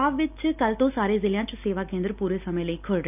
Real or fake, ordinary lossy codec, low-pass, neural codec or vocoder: real; none; 3.6 kHz; none